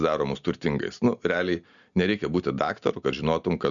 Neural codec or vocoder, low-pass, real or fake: none; 7.2 kHz; real